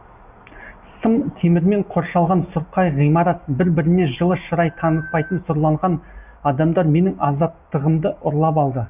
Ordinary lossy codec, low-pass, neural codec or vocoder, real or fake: none; 3.6 kHz; none; real